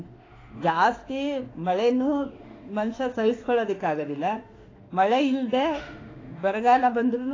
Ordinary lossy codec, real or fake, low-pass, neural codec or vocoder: AAC, 32 kbps; fake; 7.2 kHz; autoencoder, 48 kHz, 32 numbers a frame, DAC-VAE, trained on Japanese speech